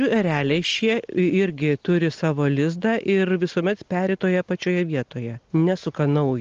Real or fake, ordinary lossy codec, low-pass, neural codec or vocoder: real; Opus, 24 kbps; 7.2 kHz; none